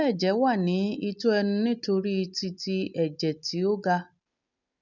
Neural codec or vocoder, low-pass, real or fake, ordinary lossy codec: none; 7.2 kHz; real; none